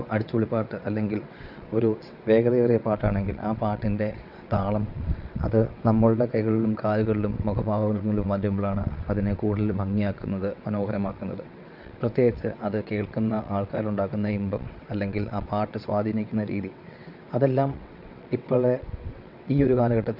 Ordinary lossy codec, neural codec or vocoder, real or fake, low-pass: none; vocoder, 22.05 kHz, 80 mel bands, WaveNeXt; fake; 5.4 kHz